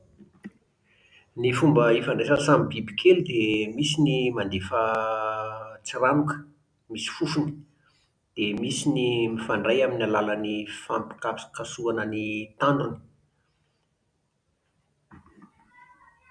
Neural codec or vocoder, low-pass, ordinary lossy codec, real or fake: none; 9.9 kHz; none; real